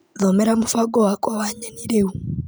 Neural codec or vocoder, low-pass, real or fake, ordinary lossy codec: none; none; real; none